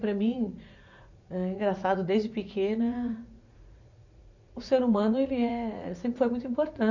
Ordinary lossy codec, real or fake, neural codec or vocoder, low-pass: none; fake; vocoder, 44.1 kHz, 80 mel bands, Vocos; 7.2 kHz